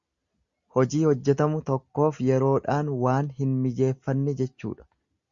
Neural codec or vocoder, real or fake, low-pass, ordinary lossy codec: none; real; 7.2 kHz; Opus, 64 kbps